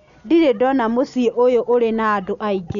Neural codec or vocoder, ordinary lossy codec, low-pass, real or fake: none; none; 7.2 kHz; real